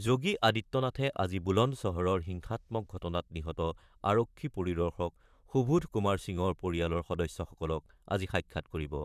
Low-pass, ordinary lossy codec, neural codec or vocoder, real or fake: 14.4 kHz; none; vocoder, 44.1 kHz, 128 mel bands, Pupu-Vocoder; fake